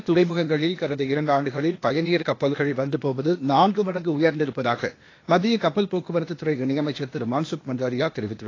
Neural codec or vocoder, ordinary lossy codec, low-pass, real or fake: codec, 16 kHz, 0.8 kbps, ZipCodec; AAC, 32 kbps; 7.2 kHz; fake